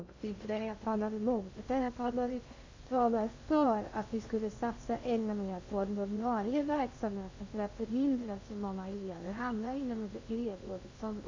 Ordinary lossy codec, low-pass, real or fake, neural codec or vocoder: MP3, 48 kbps; 7.2 kHz; fake; codec, 16 kHz in and 24 kHz out, 0.6 kbps, FocalCodec, streaming, 2048 codes